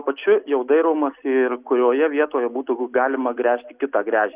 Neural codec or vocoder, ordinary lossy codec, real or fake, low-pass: none; Opus, 64 kbps; real; 3.6 kHz